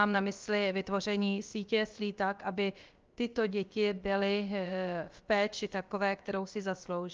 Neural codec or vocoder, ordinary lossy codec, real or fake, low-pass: codec, 16 kHz, about 1 kbps, DyCAST, with the encoder's durations; Opus, 24 kbps; fake; 7.2 kHz